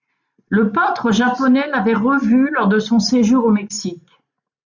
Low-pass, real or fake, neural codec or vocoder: 7.2 kHz; real; none